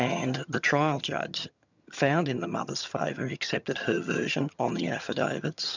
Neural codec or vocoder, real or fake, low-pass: vocoder, 22.05 kHz, 80 mel bands, HiFi-GAN; fake; 7.2 kHz